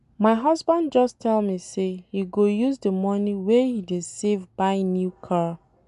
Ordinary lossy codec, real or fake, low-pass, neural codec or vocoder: none; real; 10.8 kHz; none